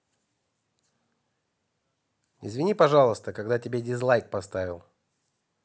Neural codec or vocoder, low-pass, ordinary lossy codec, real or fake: none; none; none; real